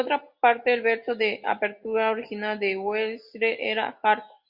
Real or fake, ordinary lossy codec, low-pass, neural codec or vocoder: real; Opus, 64 kbps; 5.4 kHz; none